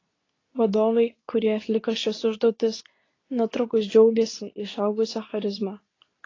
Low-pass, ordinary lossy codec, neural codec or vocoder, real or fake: 7.2 kHz; AAC, 32 kbps; codec, 24 kHz, 0.9 kbps, WavTokenizer, medium speech release version 2; fake